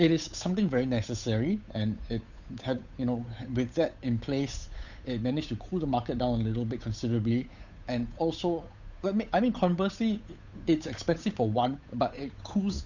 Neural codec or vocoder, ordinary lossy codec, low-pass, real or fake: codec, 16 kHz, 8 kbps, FunCodec, trained on Chinese and English, 25 frames a second; none; 7.2 kHz; fake